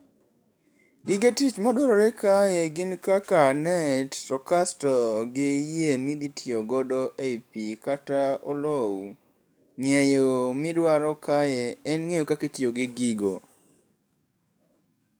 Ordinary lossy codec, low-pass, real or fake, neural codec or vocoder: none; none; fake; codec, 44.1 kHz, 7.8 kbps, DAC